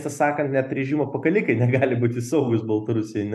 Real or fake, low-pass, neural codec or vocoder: real; 14.4 kHz; none